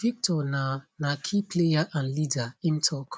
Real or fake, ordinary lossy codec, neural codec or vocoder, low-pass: real; none; none; none